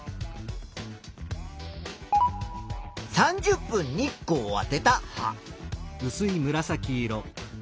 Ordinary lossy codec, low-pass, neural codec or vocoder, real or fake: none; none; none; real